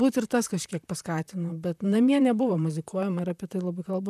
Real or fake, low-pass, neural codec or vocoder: fake; 14.4 kHz; vocoder, 44.1 kHz, 128 mel bands, Pupu-Vocoder